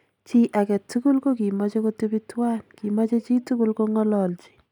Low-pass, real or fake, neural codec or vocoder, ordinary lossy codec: 19.8 kHz; real; none; none